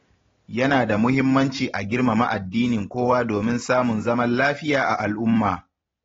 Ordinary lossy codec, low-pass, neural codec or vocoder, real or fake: AAC, 24 kbps; 7.2 kHz; none; real